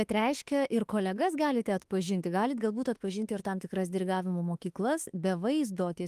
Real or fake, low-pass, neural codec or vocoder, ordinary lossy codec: fake; 14.4 kHz; autoencoder, 48 kHz, 32 numbers a frame, DAC-VAE, trained on Japanese speech; Opus, 24 kbps